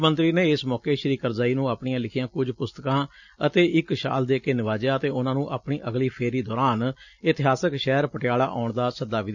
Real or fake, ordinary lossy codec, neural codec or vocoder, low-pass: real; none; none; 7.2 kHz